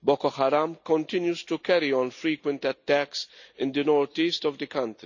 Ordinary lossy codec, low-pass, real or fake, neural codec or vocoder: none; 7.2 kHz; real; none